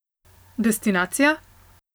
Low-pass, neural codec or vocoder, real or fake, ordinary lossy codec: none; none; real; none